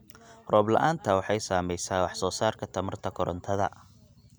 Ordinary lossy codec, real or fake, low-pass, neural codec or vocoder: none; real; none; none